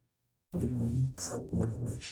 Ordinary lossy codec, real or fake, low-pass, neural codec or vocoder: none; fake; none; codec, 44.1 kHz, 0.9 kbps, DAC